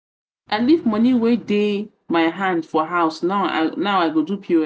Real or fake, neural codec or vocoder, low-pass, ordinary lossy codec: real; none; none; none